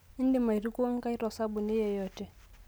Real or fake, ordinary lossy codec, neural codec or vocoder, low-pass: real; none; none; none